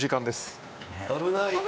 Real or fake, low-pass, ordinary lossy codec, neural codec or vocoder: fake; none; none; codec, 16 kHz, 2 kbps, X-Codec, WavLM features, trained on Multilingual LibriSpeech